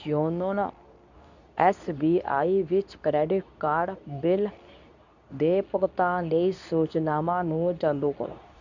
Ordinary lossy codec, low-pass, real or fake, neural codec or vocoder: none; 7.2 kHz; fake; codec, 24 kHz, 0.9 kbps, WavTokenizer, medium speech release version 2